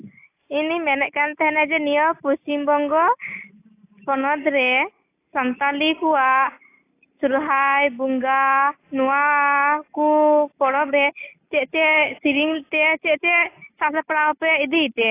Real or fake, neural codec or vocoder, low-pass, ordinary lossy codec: real; none; 3.6 kHz; AAC, 24 kbps